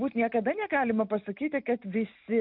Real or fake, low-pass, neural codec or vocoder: real; 5.4 kHz; none